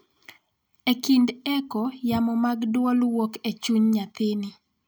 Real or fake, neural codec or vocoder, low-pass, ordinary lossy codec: real; none; none; none